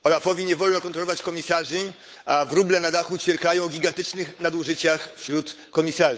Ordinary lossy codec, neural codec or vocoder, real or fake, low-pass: none; codec, 16 kHz, 8 kbps, FunCodec, trained on Chinese and English, 25 frames a second; fake; none